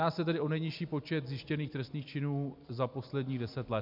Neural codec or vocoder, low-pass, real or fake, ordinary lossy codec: none; 5.4 kHz; real; MP3, 48 kbps